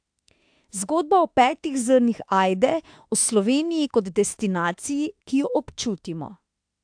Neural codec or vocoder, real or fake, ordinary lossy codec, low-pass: autoencoder, 48 kHz, 32 numbers a frame, DAC-VAE, trained on Japanese speech; fake; AAC, 64 kbps; 9.9 kHz